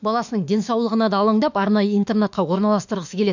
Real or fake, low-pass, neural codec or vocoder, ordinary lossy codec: fake; 7.2 kHz; autoencoder, 48 kHz, 32 numbers a frame, DAC-VAE, trained on Japanese speech; none